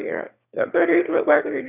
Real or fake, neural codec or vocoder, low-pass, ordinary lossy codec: fake; autoencoder, 22.05 kHz, a latent of 192 numbers a frame, VITS, trained on one speaker; 3.6 kHz; none